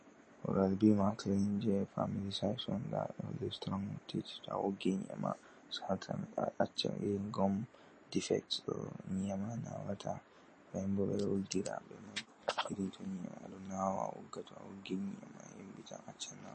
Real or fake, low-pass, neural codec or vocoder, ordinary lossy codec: real; 10.8 kHz; none; MP3, 32 kbps